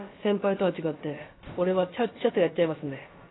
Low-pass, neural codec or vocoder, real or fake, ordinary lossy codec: 7.2 kHz; codec, 16 kHz, about 1 kbps, DyCAST, with the encoder's durations; fake; AAC, 16 kbps